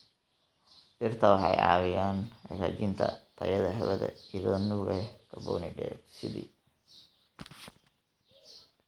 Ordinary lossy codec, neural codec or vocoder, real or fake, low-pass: Opus, 24 kbps; none; real; 19.8 kHz